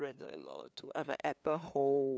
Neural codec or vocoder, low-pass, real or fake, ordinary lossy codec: codec, 16 kHz, 2 kbps, FunCodec, trained on LibriTTS, 25 frames a second; none; fake; none